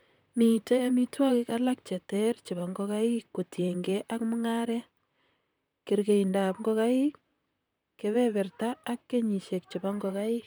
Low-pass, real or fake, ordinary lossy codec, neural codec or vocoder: none; fake; none; vocoder, 44.1 kHz, 128 mel bands, Pupu-Vocoder